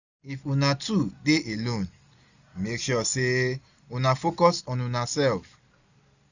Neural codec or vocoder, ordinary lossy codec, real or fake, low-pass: none; none; real; 7.2 kHz